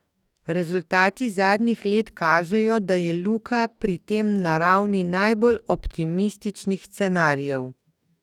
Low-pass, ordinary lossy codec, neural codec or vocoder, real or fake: 19.8 kHz; none; codec, 44.1 kHz, 2.6 kbps, DAC; fake